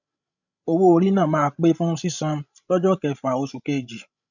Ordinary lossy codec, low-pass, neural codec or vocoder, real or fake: none; 7.2 kHz; codec, 16 kHz, 16 kbps, FreqCodec, larger model; fake